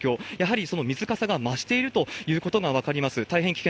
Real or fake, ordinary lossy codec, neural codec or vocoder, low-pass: real; none; none; none